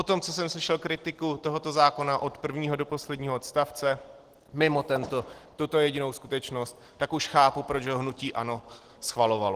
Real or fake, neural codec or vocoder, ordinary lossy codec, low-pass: real; none; Opus, 16 kbps; 14.4 kHz